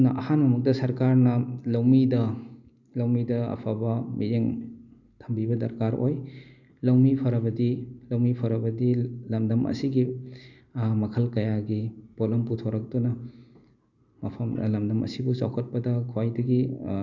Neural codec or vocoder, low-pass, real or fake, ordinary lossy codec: none; 7.2 kHz; real; none